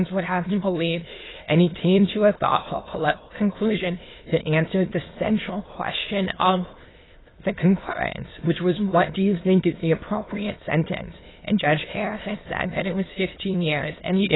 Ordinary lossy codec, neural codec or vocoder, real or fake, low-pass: AAC, 16 kbps; autoencoder, 22.05 kHz, a latent of 192 numbers a frame, VITS, trained on many speakers; fake; 7.2 kHz